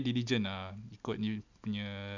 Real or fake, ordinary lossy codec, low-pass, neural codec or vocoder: real; none; 7.2 kHz; none